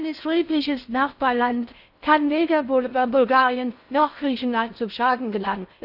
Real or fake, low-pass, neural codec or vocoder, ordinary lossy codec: fake; 5.4 kHz; codec, 16 kHz in and 24 kHz out, 0.6 kbps, FocalCodec, streaming, 4096 codes; none